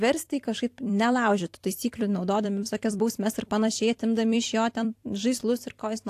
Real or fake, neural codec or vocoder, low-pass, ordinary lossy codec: fake; vocoder, 44.1 kHz, 128 mel bands every 256 samples, BigVGAN v2; 14.4 kHz; AAC, 64 kbps